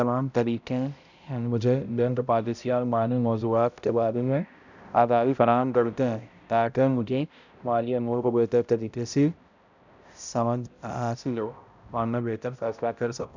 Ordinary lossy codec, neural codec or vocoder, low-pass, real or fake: none; codec, 16 kHz, 0.5 kbps, X-Codec, HuBERT features, trained on balanced general audio; 7.2 kHz; fake